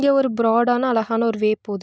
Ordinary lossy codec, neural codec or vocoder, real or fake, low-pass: none; none; real; none